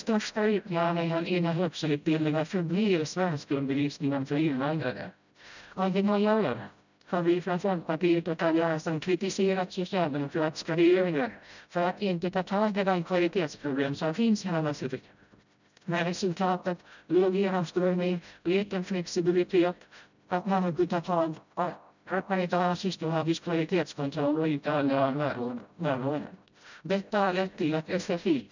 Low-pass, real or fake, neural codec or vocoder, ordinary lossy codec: 7.2 kHz; fake; codec, 16 kHz, 0.5 kbps, FreqCodec, smaller model; none